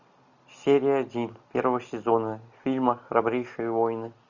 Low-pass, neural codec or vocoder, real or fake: 7.2 kHz; none; real